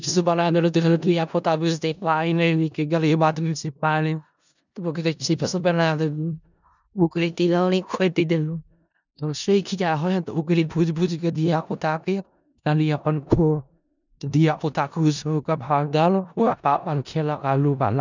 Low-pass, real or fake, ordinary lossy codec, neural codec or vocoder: 7.2 kHz; fake; none; codec, 16 kHz in and 24 kHz out, 0.4 kbps, LongCat-Audio-Codec, four codebook decoder